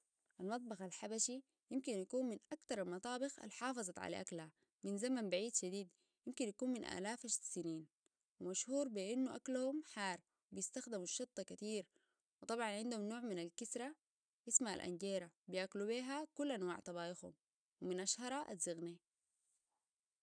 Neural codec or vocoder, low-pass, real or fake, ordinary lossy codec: none; 9.9 kHz; real; none